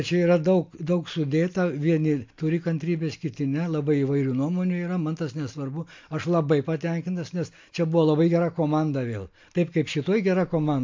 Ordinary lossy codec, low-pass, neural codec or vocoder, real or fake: MP3, 48 kbps; 7.2 kHz; none; real